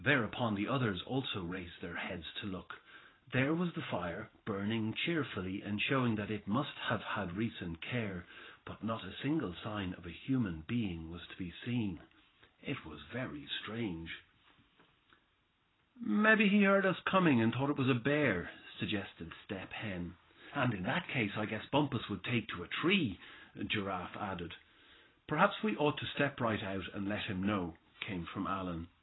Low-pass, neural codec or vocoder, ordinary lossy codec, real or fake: 7.2 kHz; vocoder, 44.1 kHz, 128 mel bands every 512 samples, BigVGAN v2; AAC, 16 kbps; fake